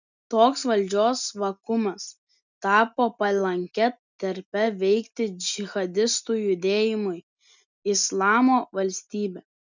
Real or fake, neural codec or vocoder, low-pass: real; none; 7.2 kHz